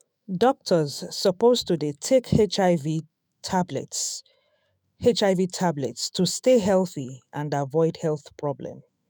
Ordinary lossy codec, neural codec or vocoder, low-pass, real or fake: none; autoencoder, 48 kHz, 128 numbers a frame, DAC-VAE, trained on Japanese speech; none; fake